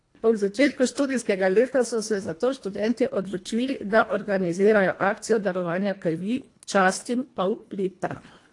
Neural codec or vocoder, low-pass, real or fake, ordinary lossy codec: codec, 24 kHz, 1.5 kbps, HILCodec; 10.8 kHz; fake; AAC, 48 kbps